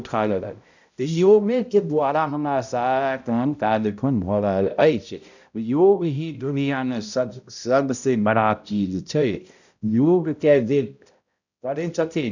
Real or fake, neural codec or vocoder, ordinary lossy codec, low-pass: fake; codec, 16 kHz, 0.5 kbps, X-Codec, HuBERT features, trained on balanced general audio; none; 7.2 kHz